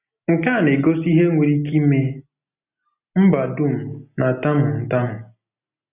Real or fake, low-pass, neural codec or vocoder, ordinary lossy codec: real; 3.6 kHz; none; none